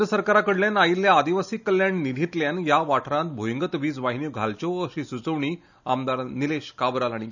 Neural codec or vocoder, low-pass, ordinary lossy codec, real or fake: none; 7.2 kHz; none; real